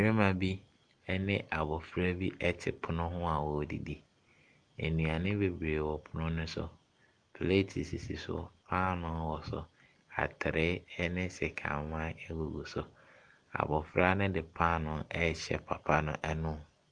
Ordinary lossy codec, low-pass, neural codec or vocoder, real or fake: Opus, 32 kbps; 9.9 kHz; none; real